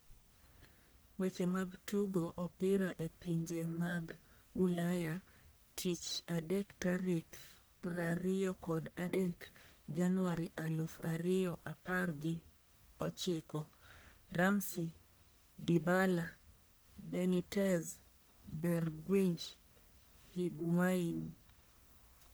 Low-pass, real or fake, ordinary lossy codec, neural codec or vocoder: none; fake; none; codec, 44.1 kHz, 1.7 kbps, Pupu-Codec